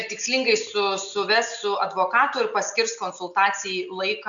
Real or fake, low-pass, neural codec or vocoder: real; 7.2 kHz; none